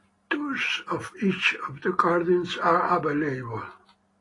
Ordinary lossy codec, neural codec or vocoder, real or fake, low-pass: AAC, 32 kbps; none; real; 10.8 kHz